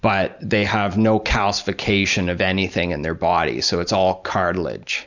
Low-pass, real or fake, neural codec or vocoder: 7.2 kHz; real; none